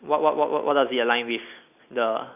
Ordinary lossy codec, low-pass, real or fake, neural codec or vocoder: none; 3.6 kHz; real; none